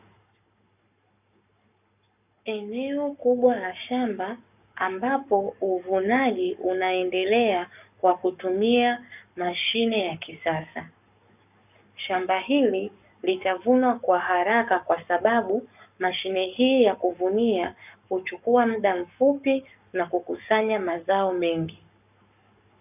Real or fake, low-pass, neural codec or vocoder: fake; 3.6 kHz; codec, 44.1 kHz, 7.8 kbps, Pupu-Codec